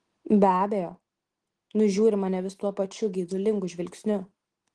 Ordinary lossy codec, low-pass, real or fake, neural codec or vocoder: Opus, 16 kbps; 9.9 kHz; real; none